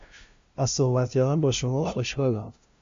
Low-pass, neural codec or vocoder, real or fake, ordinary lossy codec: 7.2 kHz; codec, 16 kHz, 0.5 kbps, FunCodec, trained on LibriTTS, 25 frames a second; fake; MP3, 48 kbps